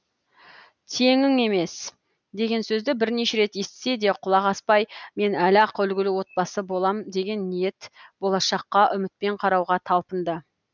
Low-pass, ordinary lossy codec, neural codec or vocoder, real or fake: 7.2 kHz; none; none; real